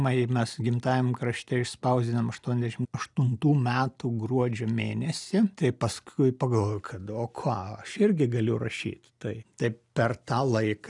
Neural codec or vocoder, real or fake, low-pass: none; real; 10.8 kHz